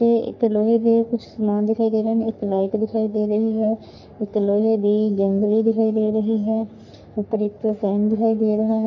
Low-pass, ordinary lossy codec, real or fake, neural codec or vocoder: 7.2 kHz; none; fake; codec, 44.1 kHz, 3.4 kbps, Pupu-Codec